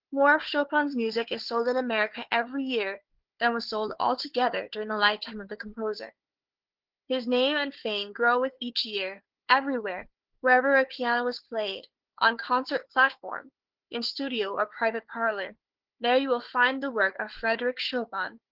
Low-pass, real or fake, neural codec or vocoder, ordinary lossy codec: 5.4 kHz; fake; codec, 16 kHz, 4 kbps, FunCodec, trained on Chinese and English, 50 frames a second; Opus, 16 kbps